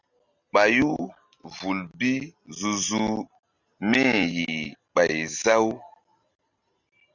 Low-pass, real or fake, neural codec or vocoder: 7.2 kHz; real; none